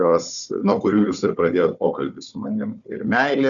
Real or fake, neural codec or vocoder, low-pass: fake; codec, 16 kHz, 4 kbps, FunCodec, trained on Chinese and English, 50 frames a second; 7.2 kHz